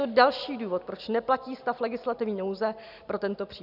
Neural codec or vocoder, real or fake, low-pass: none; real; 5.4 kHz